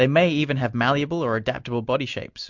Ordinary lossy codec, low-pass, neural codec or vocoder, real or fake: MP3, 64 kbps; 7.2 kHz; none; real